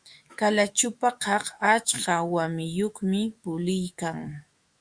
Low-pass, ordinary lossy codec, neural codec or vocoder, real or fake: 9.9 kHz; Opus, 64 kbps; autoencoder, 48 kHz, 128 numbers a frame, DAC-VAE, trained on Japanese speech; fake